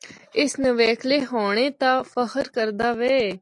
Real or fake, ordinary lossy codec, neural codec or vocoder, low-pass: real; AAC, 64 kbps; none; 10.8 kHz